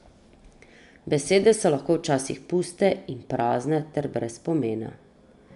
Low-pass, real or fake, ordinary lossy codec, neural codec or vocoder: 10.8 kHz; real; none; none